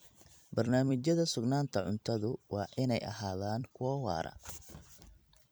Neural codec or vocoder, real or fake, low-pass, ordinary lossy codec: vocoder, 44.1 kHz, 128 mel bands every 256 samples, BigVGAN v2; fake; none; none